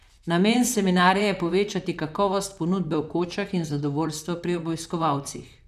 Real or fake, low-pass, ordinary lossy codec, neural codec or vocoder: fake; 14.4 kHz; none; vocoder, 44.1 kHz, 128 mel bands, Pupu-Vocoder